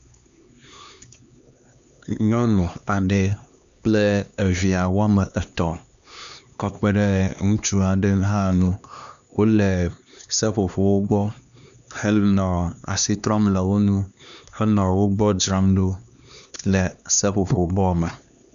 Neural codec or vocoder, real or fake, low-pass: codec, 16 kHz, 2 kbps, X-Codec, HuBERT features, trained on LibriSpeech; fake; 7.2 kHz